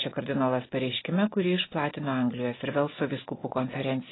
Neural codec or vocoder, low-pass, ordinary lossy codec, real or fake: none; 7.2 kHz; AAC, 16 kbps; real